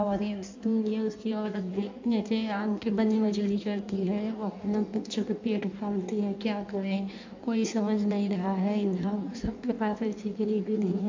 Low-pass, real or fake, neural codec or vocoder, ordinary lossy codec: 7.2 kHz; fake; codec, 16 kHz in and 24 kHz out, 1.1 kbps, FireRedTTS-2 codec; MP3, 64 kbps